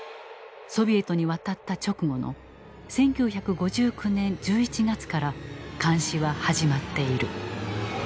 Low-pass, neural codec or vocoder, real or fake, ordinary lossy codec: none; none; real; none